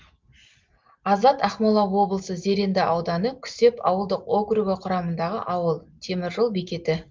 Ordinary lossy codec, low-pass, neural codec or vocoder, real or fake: Opus, 32 kbps; 7.2 kHz; none; real